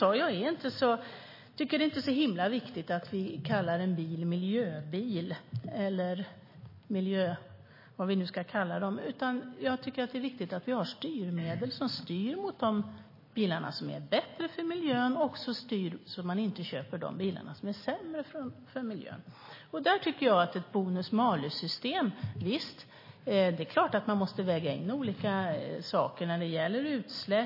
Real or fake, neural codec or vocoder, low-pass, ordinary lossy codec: real; none; 5.4 kHz; MP3, 24 kbps